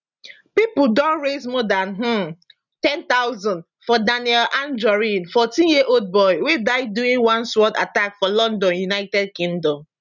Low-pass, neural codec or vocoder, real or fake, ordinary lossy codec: 7.2 kHz; none; real; none